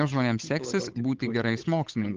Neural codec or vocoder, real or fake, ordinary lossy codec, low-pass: codec, 16 kHz, 8 kbps, FunCodec, trained on Chinese and English, 25 frames a second; fake; Opus, 32 kbps; 7.2 kHz